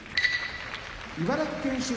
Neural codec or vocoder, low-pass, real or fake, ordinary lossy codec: none; none; real; none